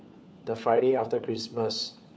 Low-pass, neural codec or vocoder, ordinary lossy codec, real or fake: none; codec, 16 kHz, 4 kbps, FunCodec, trained on LibriTTS, 50 frames a second; none; fake